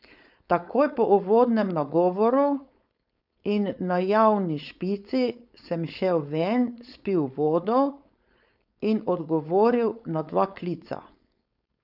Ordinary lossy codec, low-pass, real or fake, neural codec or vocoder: none; 5.4 kHz; fake; codec, 16 kHz, 4.8 kbps, FACodec